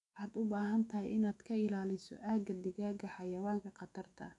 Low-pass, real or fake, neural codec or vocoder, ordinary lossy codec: 10.8 kHz; fake; autoencoder, 48 kHz, 128 numbers a frame, DAC-VAE, trained on Japanese speech; AAC, 48 kbps